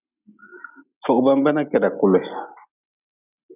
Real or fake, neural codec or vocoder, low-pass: real; none; 3.6 kHz